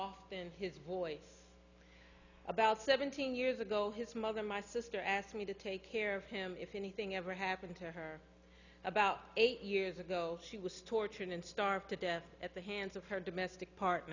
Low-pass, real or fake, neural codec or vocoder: 7.2 kHz; real; none